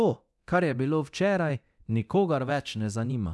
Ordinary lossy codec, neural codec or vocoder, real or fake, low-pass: none; codec, 24 kHz, 0.9 kbps, DualCodec; fake; none